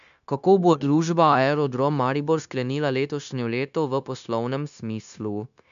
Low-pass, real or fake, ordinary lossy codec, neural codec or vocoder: 7.2 kHz; fake; none; codec, 16 kHz, 0.9 kbps, LongCat-Audio-Codec